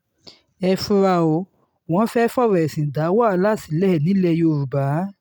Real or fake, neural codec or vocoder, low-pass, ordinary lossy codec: real; none; none; none